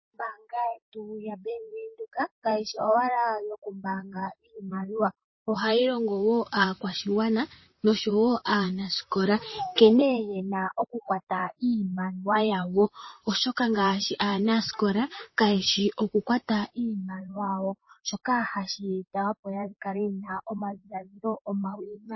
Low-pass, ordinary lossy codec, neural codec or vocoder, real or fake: 7.2 kHz; MP3, 24 kbps; vocoder, 44.1 kHz, 128 mel bands, Pupu-Vocoder; fake